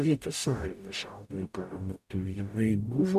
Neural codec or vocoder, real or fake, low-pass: codec, 44.1 kHz, 0.9 kbps, DAC; fake; 14.4 kHz